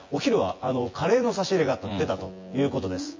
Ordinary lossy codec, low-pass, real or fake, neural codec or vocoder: MP3, 32 kbps; 7.2 kHz; fake; vocoder, 24 kHz, 100 mel bands, Vocos